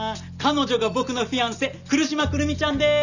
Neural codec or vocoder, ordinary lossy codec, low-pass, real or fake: none; none; 7.2 kHz; real